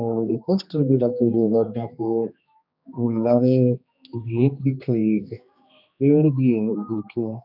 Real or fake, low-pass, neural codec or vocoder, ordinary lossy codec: fake; 5.4 kHz; codec, 16 kHz, 2 kbps, X-Codec, HuBERT features, trained on general audio; none